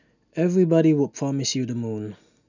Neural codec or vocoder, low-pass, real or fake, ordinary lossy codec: none; 7.2 kHz; real; none